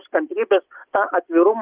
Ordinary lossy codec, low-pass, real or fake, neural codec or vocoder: Opus, 24 kbps; 3.6 kHz; real; none